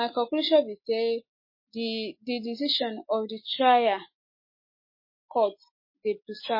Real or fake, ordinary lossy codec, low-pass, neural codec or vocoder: real; MP3, 24 kbps; 5.4 kHz; none